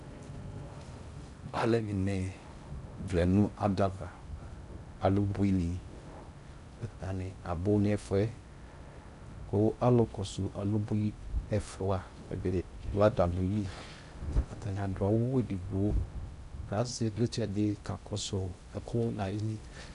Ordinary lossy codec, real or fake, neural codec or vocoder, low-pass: AAC, 96 kbps; fake; codec, 16 kHz in and 24 kHz out, 0.6 kbps, FocalCodec, streaming, 4096 codes; 10.8 kHz